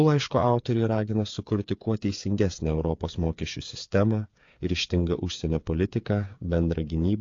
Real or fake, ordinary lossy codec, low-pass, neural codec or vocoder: fake; AAC, 48 kbps; 7.2 kHz; codec, 16 kHz, 8 kbps, FreqCodec, smaller model